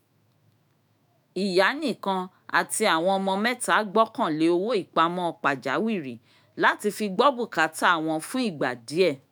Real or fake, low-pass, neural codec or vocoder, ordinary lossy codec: fake; none; autoencoder, 48 kHz, 128 numbers a frame, DAC-VAE, trained on Japanese speech; none